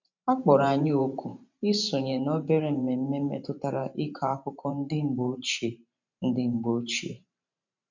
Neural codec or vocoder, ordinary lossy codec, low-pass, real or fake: vocoder, 44.1 kHz, 128 mel bands every 512 samples, BigVGAN v2; MP3, 64 kbps; 7.2 kHz; fake